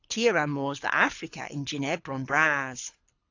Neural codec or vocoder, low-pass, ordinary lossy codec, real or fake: codec, 24 kHz, 6 kbps, HILCodec; 7.2 kHz; AAC, 48 kbps; fake